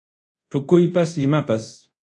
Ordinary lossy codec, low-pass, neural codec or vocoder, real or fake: AAC, 64 kbps; 10.8 kHz; codec, 24 kHz, 0.5 kbps, DualCodec; fake